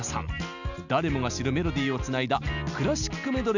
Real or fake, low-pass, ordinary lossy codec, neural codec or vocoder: real; 7.2 kHz; none; none